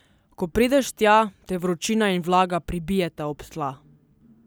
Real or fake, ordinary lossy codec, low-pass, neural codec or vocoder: real; none; none; none